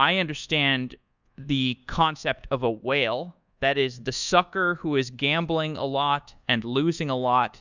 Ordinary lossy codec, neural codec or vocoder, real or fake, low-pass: Opus, 64 kbps; codec, 24 kHz, 1.2 kbps, DualCodec; fake; 7.2 kHz